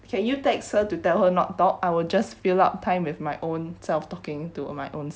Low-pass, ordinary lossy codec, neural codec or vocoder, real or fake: none; none; none; real